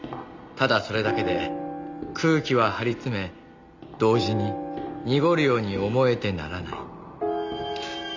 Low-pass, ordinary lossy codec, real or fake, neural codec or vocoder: 7.2 kHz; MP3, 64 kbps; real; none